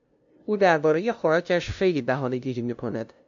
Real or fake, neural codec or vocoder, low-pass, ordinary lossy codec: fake; codec, 16 kHz, 0.5 kbps, FunCodec, trained on LibriTTS, 25 frames a second; 7.2 kHz; MP3, 64 kbps